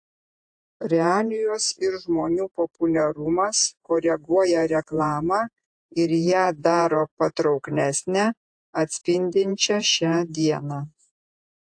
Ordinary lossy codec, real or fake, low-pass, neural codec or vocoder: AAC, 64 kbps; fake; 9.9 kHz; vocoder, 48 kHz, 128 mel bands, Vocos